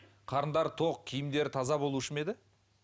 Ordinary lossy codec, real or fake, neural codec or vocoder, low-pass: none; real; none; none